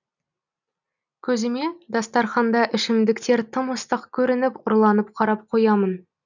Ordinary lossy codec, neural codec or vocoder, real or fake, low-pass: none; none; real; 7.2 kHz